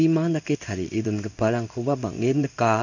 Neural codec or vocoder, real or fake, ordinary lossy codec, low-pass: codec, 16 kHz in and 24 kHz out, 1 kbps, XY-Tokenizer; fake; none; 7.2 kHz